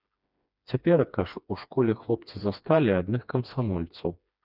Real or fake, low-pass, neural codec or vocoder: fake; 5.4 kHz; codec, 16 kHz, 2 kbps, FreqCodec, smaller model